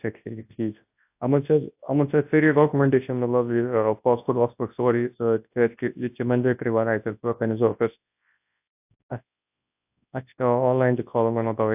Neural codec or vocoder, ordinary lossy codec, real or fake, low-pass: codec, 24 kHz, 0.9 kbps, WavTokenizer, large speech release; AAC, 32 kbps; fake; 3.6 kHz